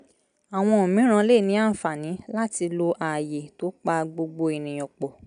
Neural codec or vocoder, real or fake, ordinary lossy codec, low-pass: none; real; none; 9.9 kHz